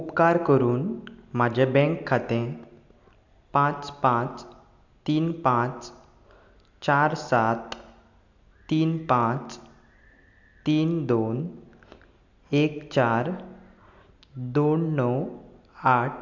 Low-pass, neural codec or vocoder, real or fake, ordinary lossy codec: 7.2 kHz; none; real; MP3, 64 kbps